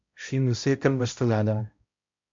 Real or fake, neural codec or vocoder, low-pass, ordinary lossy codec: fake; codec, 16 kHz, 0.5 kbps, X-Codec, HuBERT features, trained on balanced general audio; 7.2 kHz; MP3, 48 kbps